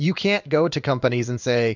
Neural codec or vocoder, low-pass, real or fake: codec, 16 kHz in and 24 kHz out, 1 kbps, XY-Tokenizer; 7.2 kHz; fake